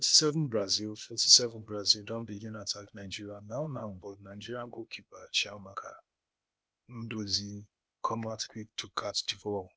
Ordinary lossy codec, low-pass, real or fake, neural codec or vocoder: none; none; fake; codec, 16 kHz, 0.8 kbps, ZipCodec